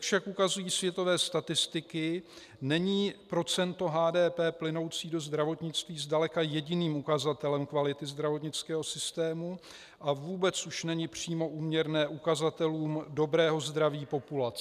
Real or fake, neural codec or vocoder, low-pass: real; none; 14.4 kHz